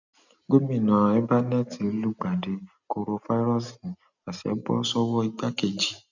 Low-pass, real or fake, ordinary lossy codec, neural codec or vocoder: 7.2 kHz; real; none; none